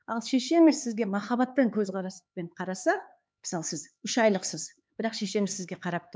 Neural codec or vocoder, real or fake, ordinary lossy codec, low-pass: codec, 16 kHz, 4 kbps, X-Codec, HuBERT features, trained on LibriSpeech; fake; none; none